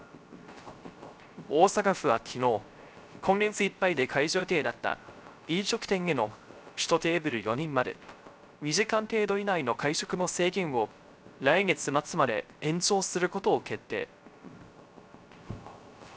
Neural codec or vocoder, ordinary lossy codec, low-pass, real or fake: codec, 16 kHz, 0.3 kbps, FocalCodec; none; none; fake